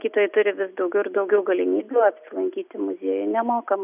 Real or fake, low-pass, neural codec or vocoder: real; 3.6 kHz; none